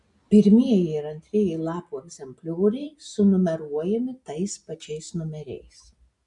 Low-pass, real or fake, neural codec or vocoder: 10.8 kHz; real; none